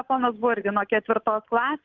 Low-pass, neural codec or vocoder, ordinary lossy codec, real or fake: 7.2 kHz; none; Opus, 32 kbps; real